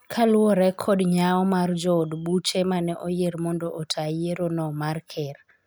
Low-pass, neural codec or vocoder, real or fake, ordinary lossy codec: none; none; real; none